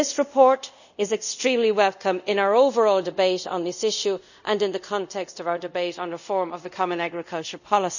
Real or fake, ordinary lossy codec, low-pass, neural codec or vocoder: fake; none; 7.2 kHz; codec, 24 kHz, 0.5 kbps, DualCodec